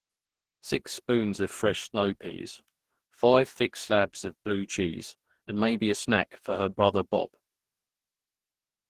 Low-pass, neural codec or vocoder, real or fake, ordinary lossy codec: 14.4 kHz; codec, 44.1 kHz, 2.6 kbps, DAC; fake; Opus, 16 kbps